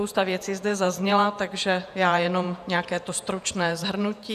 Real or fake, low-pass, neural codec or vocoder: fake; 14.4 kHz; vocoder, 48 kHz, 128 mel bands, Vocos